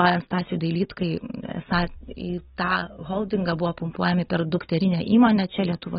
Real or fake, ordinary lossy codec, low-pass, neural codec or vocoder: real; AAC, 16 kbps; 19.8 kHz; none